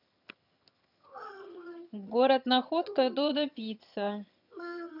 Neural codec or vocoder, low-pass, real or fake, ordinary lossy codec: vocoder, 22.05 kHz, 80 mel bands, HiFi-GAN; 5.4 kHz; fake; none